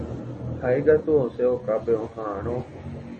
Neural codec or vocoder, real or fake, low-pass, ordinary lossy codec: none; real; 10.8 kHz; MP3, 32 kbps